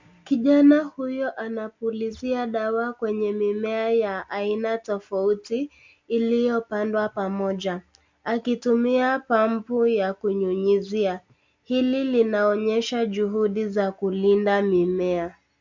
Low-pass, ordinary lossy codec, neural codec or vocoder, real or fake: 7.2 kHz; MP3, 64 kbps; none; real